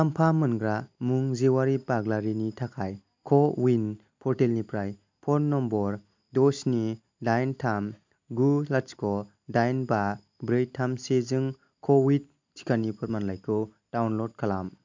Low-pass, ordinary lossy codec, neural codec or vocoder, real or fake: 7.2 kHz; MP3, 64 kbps; none; real